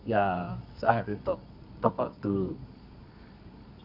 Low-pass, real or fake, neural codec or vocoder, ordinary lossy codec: 5.4 kHz; fake; codec, 24 kHz, 0.9 kbps, WavTokenizer, medium music audio release; none